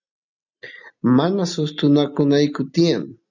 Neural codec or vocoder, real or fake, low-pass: none; real; 7.2 kHz